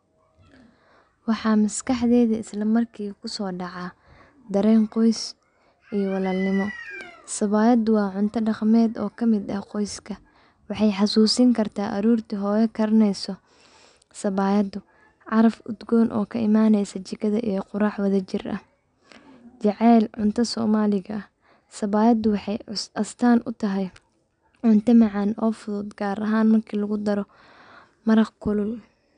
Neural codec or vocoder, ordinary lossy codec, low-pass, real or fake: none; none; 9.9 kHz; real